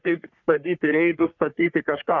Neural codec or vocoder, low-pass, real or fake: codec, 44.1 kHz, 3.4 kbps, Pupu-Codec; 7.2 kHz; fake